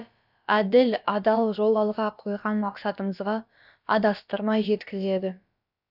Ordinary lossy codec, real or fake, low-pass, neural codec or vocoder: none; fake; 5.4 kHz; codec, 16 kHz, about 1 kbps, DyCAST, with the encoder's durations